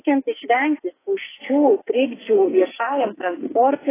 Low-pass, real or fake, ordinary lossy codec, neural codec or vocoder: 3.6 kHz; fake; AAC, 16 kbps; codec, 44.1 kHz, 2.6 kbps, SNAC